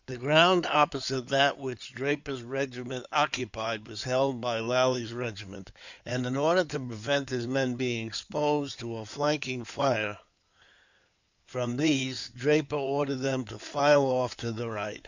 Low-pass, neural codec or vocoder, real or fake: 7.2 kHz; codec, 16 kHz in and 24 kHz out, 2.2 kbps, FireRedTTS-2 codec; fake